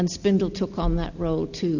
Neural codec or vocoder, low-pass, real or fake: none; 7.2 kHz; real